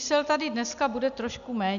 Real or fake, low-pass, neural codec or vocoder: real; 7.2 kHz; none